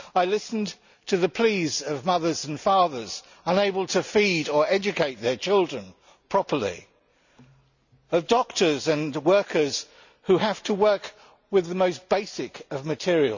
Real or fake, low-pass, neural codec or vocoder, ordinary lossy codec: real; 7.2 kHz; none; none